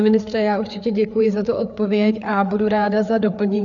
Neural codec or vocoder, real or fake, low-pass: codec, 16 kHz, 4 kbps, FreqCodec, larger model; fake; 7.2 kHz